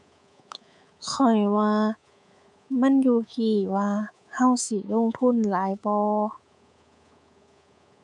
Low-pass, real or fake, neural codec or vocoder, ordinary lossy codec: 10.8 kHz; fake; codec, 24 kHz, 3.1 kbps, DualCodec; none